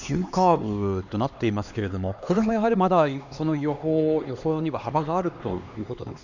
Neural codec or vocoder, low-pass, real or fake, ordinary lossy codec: codec, 16 kHz, 2 kbps, X-Codec, HuBERT features, trained on LibriSpeech; 7.2 kHz; fake; none